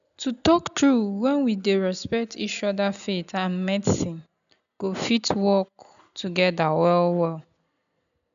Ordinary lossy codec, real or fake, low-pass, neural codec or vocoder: none; real; 7.2 kHz; none